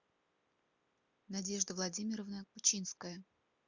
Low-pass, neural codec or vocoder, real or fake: 7.2 kHz; none; real